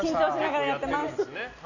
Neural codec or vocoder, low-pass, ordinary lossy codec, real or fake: none; 7.2 kHz; none; real